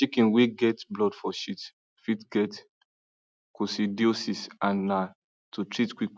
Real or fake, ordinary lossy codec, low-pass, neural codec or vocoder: real; none; none; none